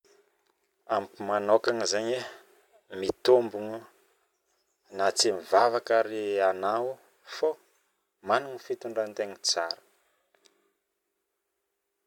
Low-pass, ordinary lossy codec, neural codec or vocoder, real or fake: 19.8 kHz; none; none; real